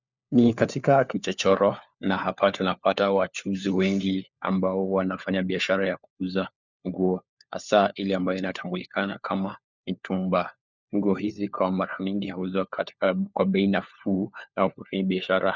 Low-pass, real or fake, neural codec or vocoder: 7.2 kHz; fake; codec, 16 kHz, 4 kbps, FunCodec, trained on LibriTTS, 50 frames a second